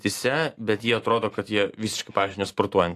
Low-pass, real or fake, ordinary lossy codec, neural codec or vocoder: 14.4 kHz; real; AAC, 64 kbps; none